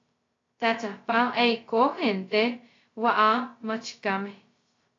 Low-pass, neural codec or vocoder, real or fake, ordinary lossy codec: 7.2 kHz; codec, 16 kHz, 0.2 kbps, FocalCodec; fake; AAC, 32 kbps